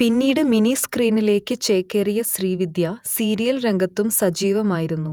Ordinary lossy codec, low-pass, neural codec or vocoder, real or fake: none; 19.8 kHz; vocoder, 48 kHz, 128 mel bands, Vocos; fake